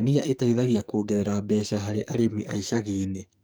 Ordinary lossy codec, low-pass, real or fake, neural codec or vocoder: none; none; fake; codec, 44.1 kHz, 2.6 kbps, SNAC